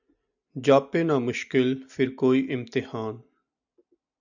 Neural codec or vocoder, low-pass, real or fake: none; 7.2 kHz; real